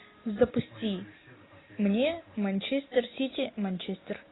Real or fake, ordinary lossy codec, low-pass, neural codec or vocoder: real; AAC, 16 kbps; 7.2 kHz; none